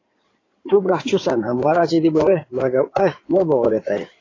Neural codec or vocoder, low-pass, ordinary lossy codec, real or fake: codec, 16 kHz in and 24 kHz out, 2.2 kbps, FireRedTTS-2 codec; 7.2 kHz; AAC, 32 kbps; fake